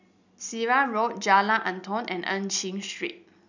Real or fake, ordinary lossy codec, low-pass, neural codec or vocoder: real; none; 7.2 kHz; none